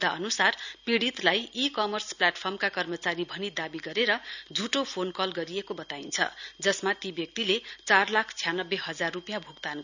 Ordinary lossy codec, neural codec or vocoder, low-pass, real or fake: none; none; 7.2 kHz; real